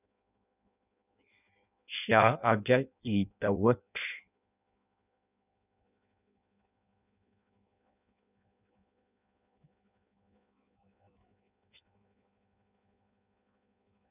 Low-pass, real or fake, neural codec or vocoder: 3.6 kHz; fake; codec, 16 kHz in and 24 kHz out, 0.6 kbps, FireRedTTS-2 codec